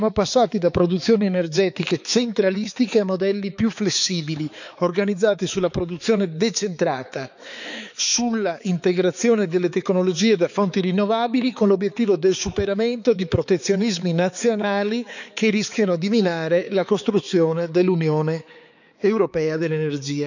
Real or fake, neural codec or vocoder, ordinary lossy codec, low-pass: fake; codec, 16 kHz, 4 kbps, X-Codec, HuBERT features, trained on balanced general audio; none; 7.2 kHz